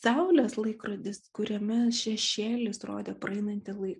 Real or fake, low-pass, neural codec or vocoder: real; 10.8 kHz; none